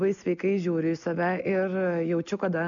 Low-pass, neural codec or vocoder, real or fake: 7.2 kHz; none; real